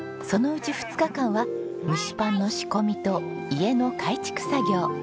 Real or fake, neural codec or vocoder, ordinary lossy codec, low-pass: real; none; none; none